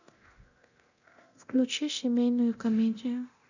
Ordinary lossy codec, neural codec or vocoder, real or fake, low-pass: none; codec, 24 kHz, 0.9 kbps, DualCodec; fake; 7.2 kHz